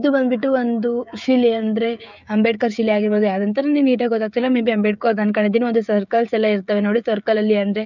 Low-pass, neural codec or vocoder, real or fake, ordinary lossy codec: 7.2 kHz; codec, 16 kHz, 16 kbps, FreqCodec, smaller model; fake; none